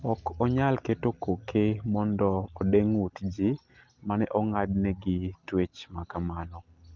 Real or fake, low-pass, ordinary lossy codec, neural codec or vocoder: real; 7.2 kHz; Opus, 24 kbps; none